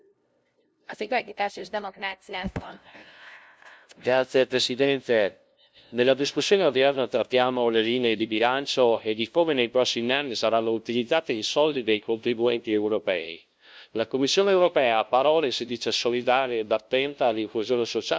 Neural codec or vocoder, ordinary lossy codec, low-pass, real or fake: codec, 16 kHz, 0.5 kbps, FunCodec, trained on LibriTTS, 25 frames a second; none; none; fake